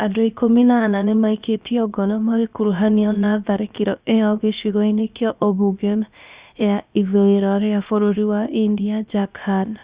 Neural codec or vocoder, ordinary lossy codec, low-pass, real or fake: codec, 16 kHz, about 1 kbps, DyCAST, with the encoder's durations; Opus, 64 kbps; 3.6 kHz; fake